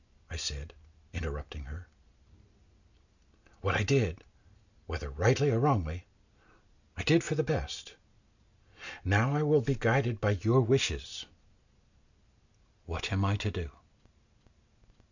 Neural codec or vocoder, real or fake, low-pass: none; real; 7.2 kHz